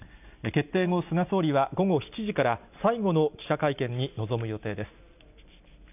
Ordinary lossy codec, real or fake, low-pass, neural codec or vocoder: none; real; 3.6 kHz; none